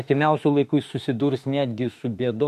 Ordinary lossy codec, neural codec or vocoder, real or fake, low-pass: MP3, 64 kbps; autoencoder, 48 kHz, 32 numbers a frame, DAC-VAE, trained on Japanese speech; fake; 14.4 kHz